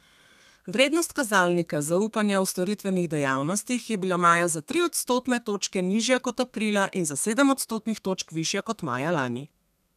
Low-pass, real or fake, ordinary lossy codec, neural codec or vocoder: 14.4 kHz; fake; none; codec, 32 kHz, 1.9 kbps, SNAC